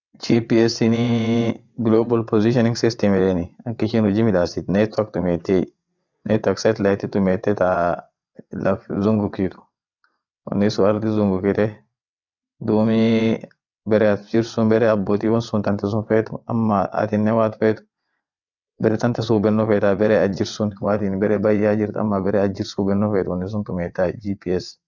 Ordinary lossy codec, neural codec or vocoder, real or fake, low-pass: none; vocoder, 22.05 kHz, 80 mel bands, WaveNeXt; fake; 7.2 kHz